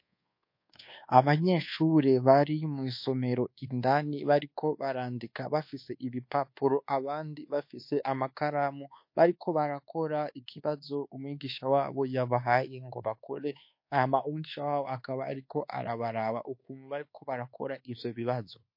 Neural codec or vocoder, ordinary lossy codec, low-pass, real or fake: codec, 24 kHz, 1.2 kbps, DualCodec; MP3, 32 kbps; 5.4 kHz; fake